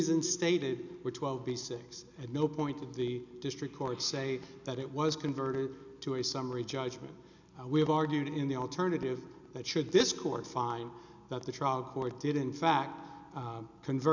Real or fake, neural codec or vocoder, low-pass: real; none; 7.2 kHz